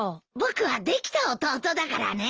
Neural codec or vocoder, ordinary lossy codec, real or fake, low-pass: none; Opus, 24 kbps; real; 7.2 kHz